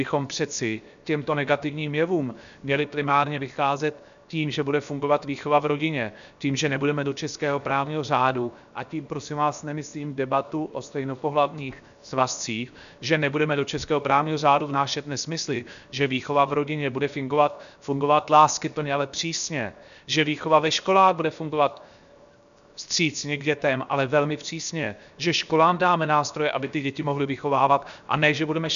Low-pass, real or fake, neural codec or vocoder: 7.2 kHz; fake; codec, 16 kHz, 0.7 kbps, FocalCodec